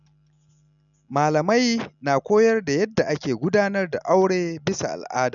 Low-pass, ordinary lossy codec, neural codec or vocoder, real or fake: 7.2 kHz; none; none; real